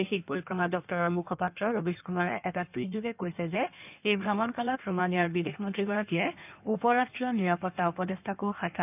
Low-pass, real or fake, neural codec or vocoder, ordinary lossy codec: 3.6 kHz; fake; codec, 16 kHz in and 24 kHz out, 1.1 kbps, FireRedTTS-2 codec; none